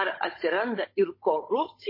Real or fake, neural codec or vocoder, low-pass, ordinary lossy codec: fake; codec, 16 kHz, 16 kbps, FunCodec, trained on Chinese and English, 50 frames a second; 5.4 kHz; MP3, 24 kbps